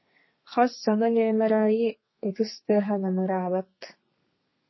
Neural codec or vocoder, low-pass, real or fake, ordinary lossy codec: codec, 32 kHz, 1.9 kbps, SNAC; 7.2 kHz; fake; MP3, 24 kbps